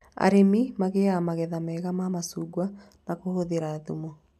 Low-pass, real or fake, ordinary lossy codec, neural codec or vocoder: 14.4 kHz; real; none; none